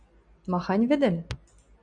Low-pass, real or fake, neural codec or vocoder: 9.9 kHz; real; none